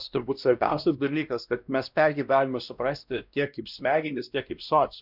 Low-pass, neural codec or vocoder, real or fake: 5.4 kHz; codec, 16 kHz, 1 kbps, X-Codec, WavLM features, trained on Multilingual LibriSpeech; fake